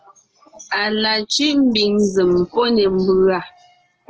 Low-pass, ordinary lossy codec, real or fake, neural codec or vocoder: 7.2 kHz; Opus, 16 kbps; real; none